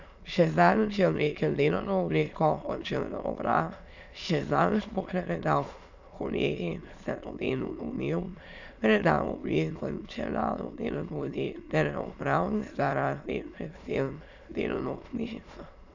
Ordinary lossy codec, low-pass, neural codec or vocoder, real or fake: none; 7.2 kHz; autoencoder, 22.05 kHz, a latent of 192 numbers a frame, VITS, trained on many speakers; fake